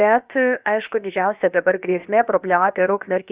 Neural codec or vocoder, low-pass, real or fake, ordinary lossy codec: codec, 16 kHz, about 1 kbps, DyCAST, with the encoder's durations; 3.6 kHz; fake; Opus, 64 kbps